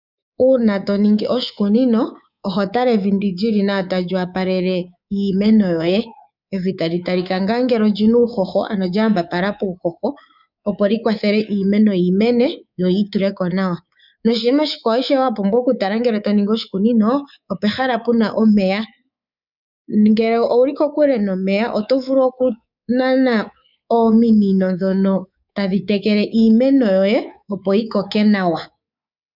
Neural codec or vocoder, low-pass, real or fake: codec, 24 kHz, 3.1 kbps, DualCodec; 5.4 kHz; fake